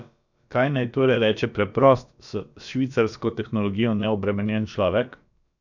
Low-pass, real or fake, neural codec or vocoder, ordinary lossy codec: 7.2 kHz; fake; codec, 16 kHz, about 1 kbps, DyCAST, with the encoder's durations; none